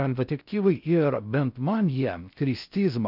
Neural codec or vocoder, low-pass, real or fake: codec, 16 kHz in and 24 kHz out, 0.6 kbps, FocalCodec, streaming, 4096 codes; 5.4 kHz; fake